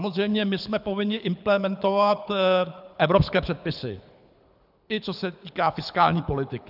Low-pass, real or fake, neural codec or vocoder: 5.4 kHz; fake; codec, 24 kHz, 6 kbps, HILCodec